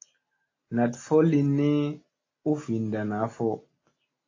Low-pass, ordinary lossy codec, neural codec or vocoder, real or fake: 7.2 kHz; AAC, 32 kbps; none; real